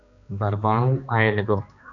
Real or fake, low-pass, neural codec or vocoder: fake; 7.2 kHz; codec, 16 kHz, 2 kbps, X-Codec, HuBERT features, trained on balanced general audio